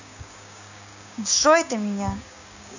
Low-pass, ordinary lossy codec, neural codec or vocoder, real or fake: 7.2 kHz; none; codec, 16 kHz, 6 kbps, DAC; fake